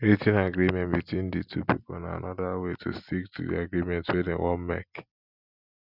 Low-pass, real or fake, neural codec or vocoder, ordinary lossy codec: 5.4 kHz; real; none; none